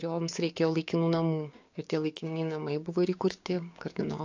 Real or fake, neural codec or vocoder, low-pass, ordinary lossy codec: fake; codec, 16 kHz, 6 kbps, DAC; 7.2 kHz; AAC, 48 kbps